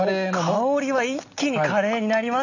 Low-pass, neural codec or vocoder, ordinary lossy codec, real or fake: 7.2 kHz; none; none; real